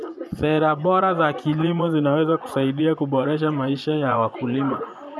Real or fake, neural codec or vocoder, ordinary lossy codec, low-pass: fake; vocoder, 24 kHz, 100 mel bands, Vocos; none; none